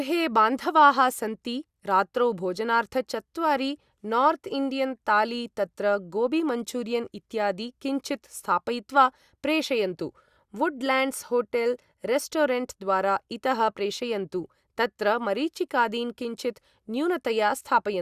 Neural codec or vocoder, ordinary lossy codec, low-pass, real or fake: none; Opus, 64 kbps; 14.4 kHz; real